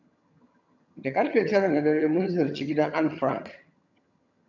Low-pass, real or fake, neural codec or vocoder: 7.2 kHz; fake; vocoder, 22.05 kHz, 80 mel bands, HiFi-GAN